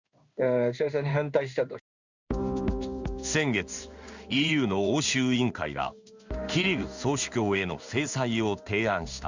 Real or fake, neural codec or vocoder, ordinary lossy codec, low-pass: fake; codec, 16 kHz in and 24 kHz out, 1 kbps, XY-Tokenizer; Opus, 64 kbps; 7.2 kHz